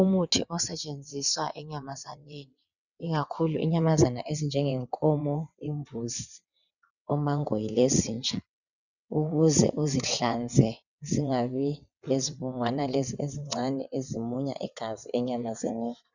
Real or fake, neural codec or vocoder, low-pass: fake; vocoder, 22.05 kHz, 80 mel bands, WaveNeXt; 7.2 kHz